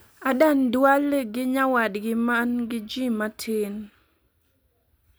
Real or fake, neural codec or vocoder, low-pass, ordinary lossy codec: real; none; none; none